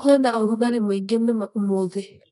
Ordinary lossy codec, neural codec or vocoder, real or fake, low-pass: none; codec, 24 kHz, 0.9 kbps, WavTokenizer, medium music audio release; fake; 10.8 kHz